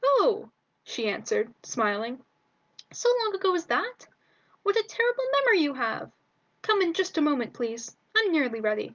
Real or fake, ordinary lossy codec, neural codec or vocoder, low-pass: real; Opus, 24 kbps; none; 7.2 kHz